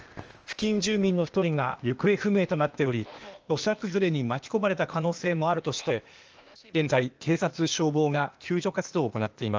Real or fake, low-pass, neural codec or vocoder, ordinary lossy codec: fake; 7.2 kHz; codec, 16 kHz, 0.8 kbps, ZipCodec; Opus, 24 kbps